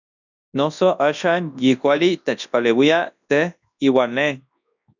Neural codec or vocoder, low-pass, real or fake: codec, 24 kHz, 0.9 kbps, WavTokenizer, large speech release; 7.2 kHz; fake